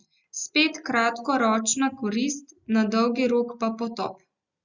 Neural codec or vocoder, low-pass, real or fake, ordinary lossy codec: none; 7.2 kHz; real; Opus, 64 kbps